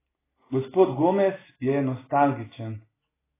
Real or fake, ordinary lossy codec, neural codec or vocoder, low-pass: real; AAC, 16 kbps; none; 3.6 kHz